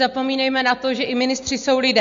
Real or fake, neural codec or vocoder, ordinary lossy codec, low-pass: real; none; MP3, 48 kbps; 7.2 kHz